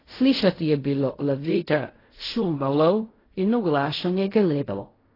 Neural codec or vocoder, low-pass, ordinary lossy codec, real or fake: codec, 16 kHz in and 24 kHz out, 0.4 kbps, LongCat-Audio-Codec, fine tuned four codebook decoder; 5.4 kHz; AAC, 24 kbps; fake